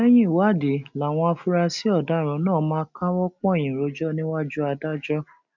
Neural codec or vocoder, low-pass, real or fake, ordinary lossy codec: none; 7.2 kHz; real; none